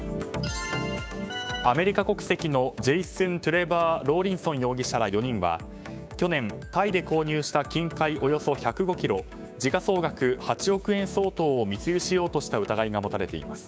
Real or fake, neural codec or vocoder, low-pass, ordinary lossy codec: fake; codec, 16 kHz, 6 kbps, DAC; none; none